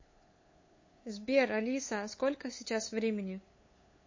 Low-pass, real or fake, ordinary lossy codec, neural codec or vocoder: 7.2 kHz; fake; MP3, 32 kbps; codec, 16 kHz, 8 kbps, FunCodec, trained on LibriTTS, 25 frames a second